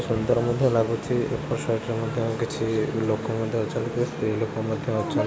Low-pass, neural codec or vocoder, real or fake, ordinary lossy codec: none; none; real; none